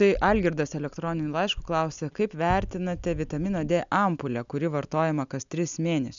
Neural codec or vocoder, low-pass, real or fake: none; 7.2 kHz; real